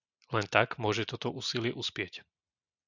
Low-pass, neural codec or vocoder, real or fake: 7.2 kHz; none; real